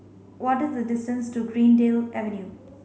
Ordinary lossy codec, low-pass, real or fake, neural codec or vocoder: none; none; real; none